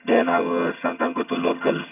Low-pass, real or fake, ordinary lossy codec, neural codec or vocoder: 3.6 kHz; fake; none; vocoder, 22.05 kHz, 80 mel bands, HiFi-GAN